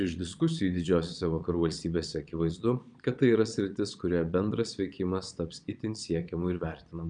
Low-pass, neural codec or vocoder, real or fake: 9.9 kHz; vocoder, 22.05 kHz, 80 mel bands, Vocos; fake